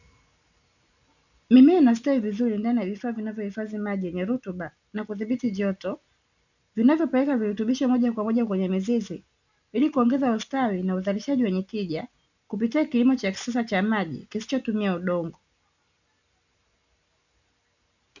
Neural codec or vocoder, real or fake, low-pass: none; real; 7.2 kHz